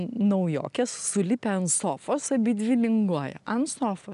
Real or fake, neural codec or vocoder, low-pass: real; none; 10.8 kHz